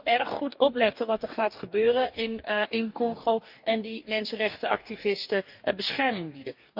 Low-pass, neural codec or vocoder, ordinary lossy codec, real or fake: 5.4 kHz; codec, 44.1 kHz, 2.6 kbps, DAC; none; fake